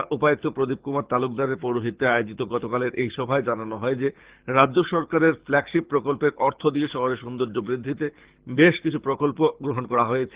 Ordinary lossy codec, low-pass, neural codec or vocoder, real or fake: Opus, 16 kbps; 3.6 kHz; codec, 24 kHz, 6 kbps, HILCodec; fake